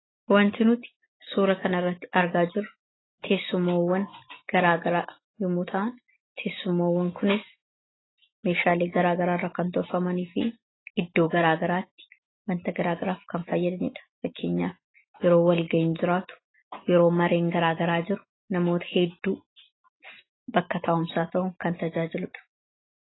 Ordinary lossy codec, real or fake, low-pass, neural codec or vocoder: AAC, 16 kbps; real; 7.2 kHz; none